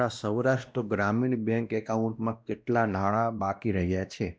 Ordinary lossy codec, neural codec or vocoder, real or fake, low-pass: none; codec, 16 kHz, 1 kbps, X-Codec, WavLM features, trained on Multilingual LibriSpeech; fake; none